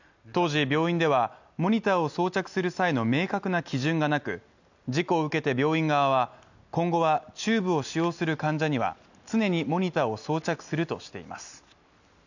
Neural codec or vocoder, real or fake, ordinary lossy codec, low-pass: none; real; none; 7.2 kHz